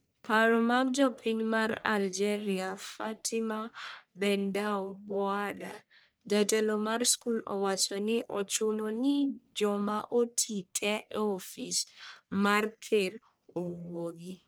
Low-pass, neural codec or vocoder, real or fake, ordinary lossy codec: none; codec, 44.1 kHz, 1.7 kbps, Pupu-Codec; fake; none